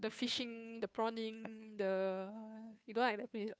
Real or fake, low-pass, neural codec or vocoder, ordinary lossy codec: fake; none; codec, 16 kHz, 2 kbps, FunCodec, trained on Chinese and English, 25 frames a second; none